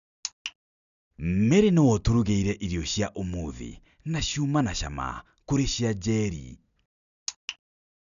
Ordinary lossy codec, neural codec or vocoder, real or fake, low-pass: none; none; real; 7.2 kHz